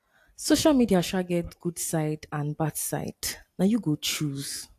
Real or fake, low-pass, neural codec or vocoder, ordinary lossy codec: real; 14.4 kHz; none; AAC, 64 kbps